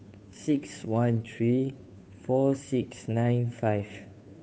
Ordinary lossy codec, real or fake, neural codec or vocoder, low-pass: none; fake; codec, 16 kHz, 2 kbps, FunCodec, trained on Chinese and English, 25 frames a second; none